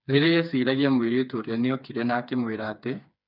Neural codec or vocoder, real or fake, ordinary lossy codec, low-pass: codec, 16 kHz, 4 kbps, FreqCodec, smaller model; fake; none; 5.4 kHz